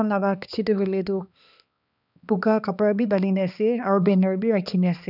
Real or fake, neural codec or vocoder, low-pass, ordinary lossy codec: fake; codec, 16 kHz, 2 kbps, X-Codec, HuBERT features, trained on balanced general audio; 5.4 kHz; none